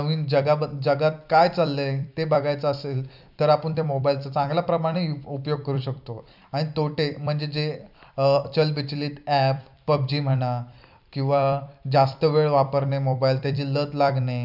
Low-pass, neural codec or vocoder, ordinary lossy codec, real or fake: 5.4 kHz; vocoder, 44.1 kHz, 128 mel bands every 256 samples, BigVGAN v2; none; fake